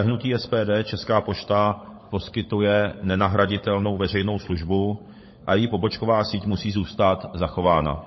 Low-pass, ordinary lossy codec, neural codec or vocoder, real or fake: 7.2 kHz; MP3, 24 kbps; codec, 16 kHz, 16 kbps, FunCodec, trained on LibriTTS, 50 frames a second; fake